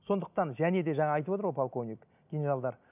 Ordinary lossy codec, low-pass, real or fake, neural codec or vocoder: none; 3.6 kHz; real; none